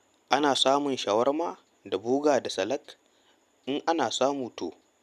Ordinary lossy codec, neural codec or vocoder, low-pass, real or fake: none; none; 14.4 kHz; real